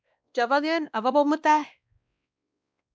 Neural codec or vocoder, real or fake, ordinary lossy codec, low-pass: codec, 16 kHz, 1 kbps, X-Codec, WavLM features, trained on Multilingual LibriSpeech; fake; none; none